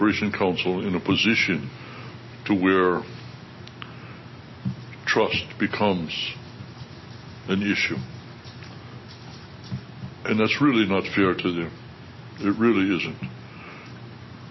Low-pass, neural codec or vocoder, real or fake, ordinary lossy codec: 7.2 kHz; none; real; MP3, 24 kbps